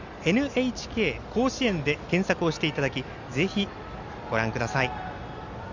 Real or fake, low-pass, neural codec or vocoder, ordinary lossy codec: real; 7.2 kHz; none; Opus, 64 kbps